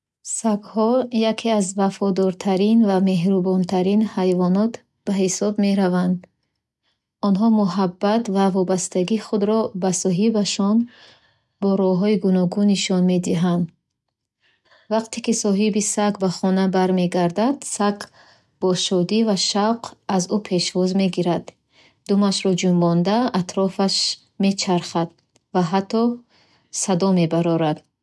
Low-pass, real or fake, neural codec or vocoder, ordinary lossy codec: none; real; none; none